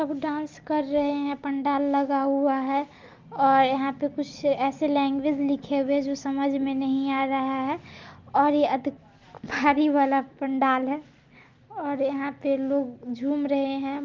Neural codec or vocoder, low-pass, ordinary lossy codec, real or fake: none; 7.2 kHz; Opus, 32 kbps; real